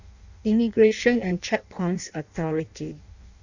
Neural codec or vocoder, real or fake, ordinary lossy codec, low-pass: codec, 16 kHz in and 24 kHz out, 0.6 kbps, FireRedTTS-2 codec; fake; none; 7.2 kHz